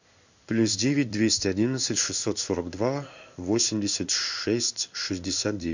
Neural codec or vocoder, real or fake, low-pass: codec, 16 kHz in and 24 kHz out, 1 kbps, XY-Tokenizer; fake; 7.2 kHz